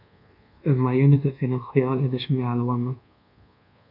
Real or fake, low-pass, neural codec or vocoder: fake; 5.4 kHz; codec, 24 kHz, 1.2 kbps, DualCodec